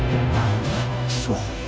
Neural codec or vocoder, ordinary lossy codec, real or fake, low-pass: codec, 16 kHz, 0.5 kbps, FunCodec, trained on Chinese and English, 25 frames a second; none; fake; none